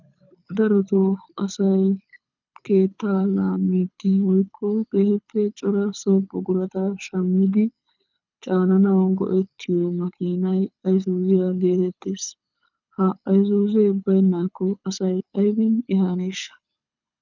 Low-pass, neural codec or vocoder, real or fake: 7.2 kHz; codec, 24 kHz, 6 kbps, HILCodec; fake